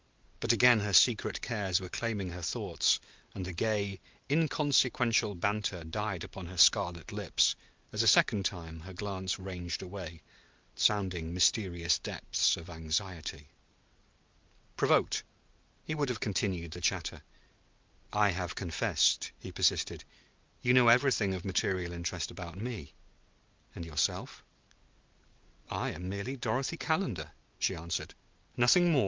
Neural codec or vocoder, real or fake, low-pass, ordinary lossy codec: none; real; 7.2 kHz; Opus, 32 kbps